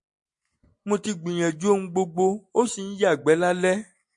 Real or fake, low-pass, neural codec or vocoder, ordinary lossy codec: real; 10.8 kHz; none; MP3, 48 kbps